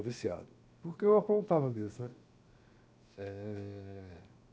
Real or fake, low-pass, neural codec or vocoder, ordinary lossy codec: fake; none; codec, 16 kHz, 0.7 kbps, FocalCodec; none